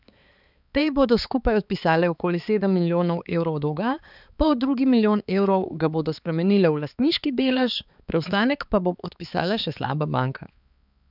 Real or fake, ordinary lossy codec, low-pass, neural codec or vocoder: fake; none; 5.4 kHz; codec, 16 kHz, 4 kbps, X-Codec, HuBERT features, trained on balanced general audio